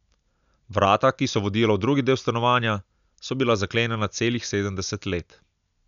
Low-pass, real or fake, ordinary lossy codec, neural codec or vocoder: 7.2 kHz; real; none; none